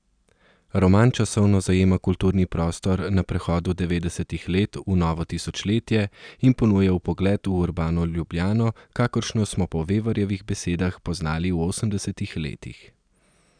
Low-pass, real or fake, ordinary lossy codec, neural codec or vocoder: 9.9 kHz; real; none; none